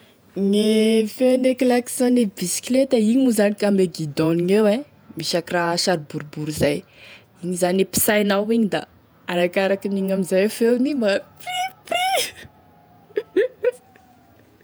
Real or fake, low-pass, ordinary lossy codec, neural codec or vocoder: fake; none; none; vocoder, 48 kHz, 128 mel bands, Vocos